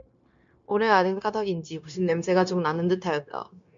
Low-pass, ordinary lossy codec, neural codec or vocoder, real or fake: 7.2 kHz; MP3, 64 kbps; codec, 16 kHz, 0.9 kbps, LongCat-Audio-Codec; fake